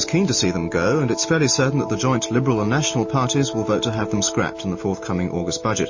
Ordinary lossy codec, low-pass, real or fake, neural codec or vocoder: MP3, 32 kbps; 7.2 kHz; real; none